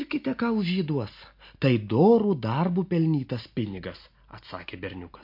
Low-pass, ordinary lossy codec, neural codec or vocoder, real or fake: 5.4 kHz; MP3, 32 kbps; none; real